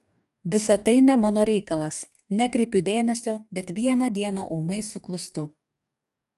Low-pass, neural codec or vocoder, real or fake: 14.4 kHz; codec, 44.1 kHz, 2.6 kbps, DAC; fake